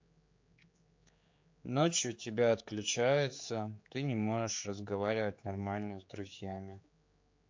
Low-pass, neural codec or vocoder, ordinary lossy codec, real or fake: 7.2 kHz; codec, 16 kHz, 4 kbps, X-Codec, HuBERT features, trained on general audio; MP3, 48 kbps; fake